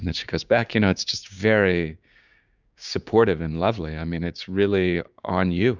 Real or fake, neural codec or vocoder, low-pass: fake; codec, 16 kHz, 6 kbps, DAC; 7.2 kHz